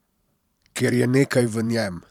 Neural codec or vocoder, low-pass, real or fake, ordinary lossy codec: vocoder, 44.1 kHz, 128 mel bands every 512 samples, BigVGAN v2; 19.8 kHz; fake; none